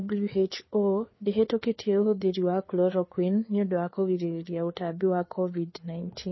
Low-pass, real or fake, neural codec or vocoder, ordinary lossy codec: 7.2 kHz; fake; codec, 16 kHz, 4 kbps, FreqCodec, larger model; MP3, 24 kbps